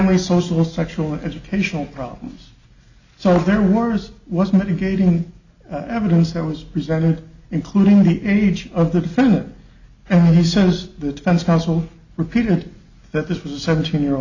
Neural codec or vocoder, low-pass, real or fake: none; 7.2 kHz; real